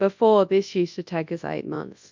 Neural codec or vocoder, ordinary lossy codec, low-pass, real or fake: codec, 24 kHz, 0.9 kbps, WavTokenizer, large speech release; MP3, 64 kbps; 7.2 kHz; fake